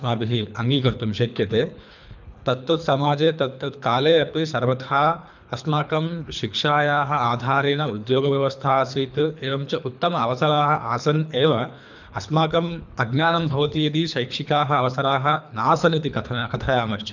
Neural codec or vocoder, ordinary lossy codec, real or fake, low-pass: codec, 24 kHz, 3 kbps, HILCodec; none; fake; 7.2 kHz